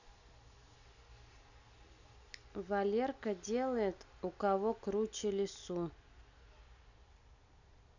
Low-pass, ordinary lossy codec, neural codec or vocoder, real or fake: 7.2 kHz; none; none; real